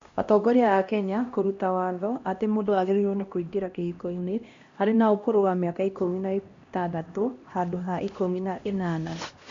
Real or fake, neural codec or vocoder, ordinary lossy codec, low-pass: fake; codec, 16 kHz, 1 kbps, X-Codec, HuBERT features, trained on LibriSpeech; MP3, 48 kbps; 7.2 kHz